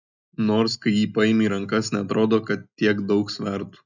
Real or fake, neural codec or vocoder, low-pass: real; none; 7.2 kHz